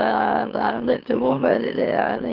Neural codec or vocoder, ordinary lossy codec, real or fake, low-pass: autoencoder, 44.1 kHz, a latent of 192 numbers a frame, MeloTTS; Opus, 16 kbps; fake; 5.4 kHz